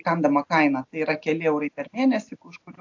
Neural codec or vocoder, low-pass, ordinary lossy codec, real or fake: none; 7.2 kHz; AAC, 48 kbps; real